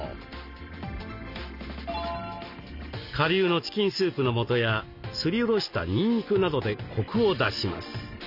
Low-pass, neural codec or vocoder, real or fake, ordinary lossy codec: 5.4 kHz; none; real; none